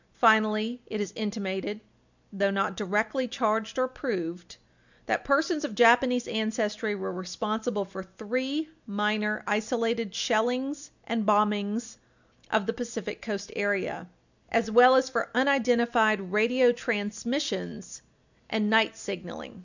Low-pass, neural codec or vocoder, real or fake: 7.2 kHz; none; real